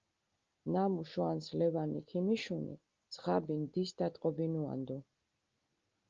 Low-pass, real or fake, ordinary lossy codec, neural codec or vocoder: 7.2 kHz; real; Opus, 24 kbps; none